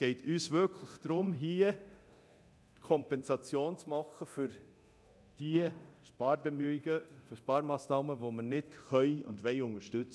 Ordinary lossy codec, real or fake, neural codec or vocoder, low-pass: none; fake; codec, 24 kHz, 0.9 kbps, DualCodec; none